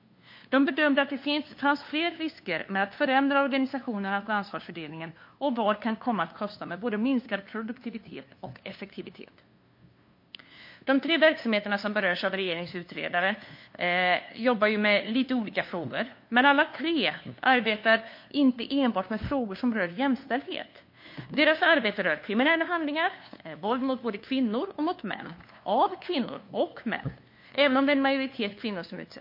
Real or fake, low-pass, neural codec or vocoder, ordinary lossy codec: fake; 5.4 kHz; codec, 16 kHz, 2 kbps, FunCodec, trained on LibriTTS, 25 frames a second; MP3, 32 kbps